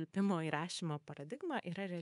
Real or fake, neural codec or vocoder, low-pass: fake; codec, 24 kHz, 3.1 kbps, DualCodec; 10.8 kHz